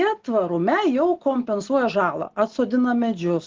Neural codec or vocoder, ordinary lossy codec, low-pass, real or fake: none; Opus, 16 kbps; 7.2 kHz; real